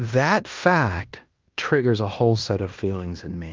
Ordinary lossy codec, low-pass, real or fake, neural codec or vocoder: Opus, 24 kbps; 7.2 kHz; fake; codec, 16 kHz in and 24 kHz out, 0.9 kbps, LongCat-Audio-Codec, fine tuned four codebook decoder